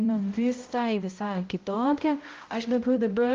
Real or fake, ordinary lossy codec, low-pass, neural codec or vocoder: fake; Opus, 32 kbps; 7.2 kHz; codec, 16 kHz, 0.5 kbps, X-Codec, HuBERT features, trained on balanced general audio